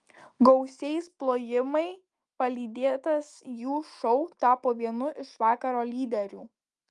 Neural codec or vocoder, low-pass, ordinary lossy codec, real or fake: autoencoder, 48 kHz, 128 numbers a frame, DAC-VAE, trained on Japanese speech; 10.8 kHz; Opus, 32 kbps; fake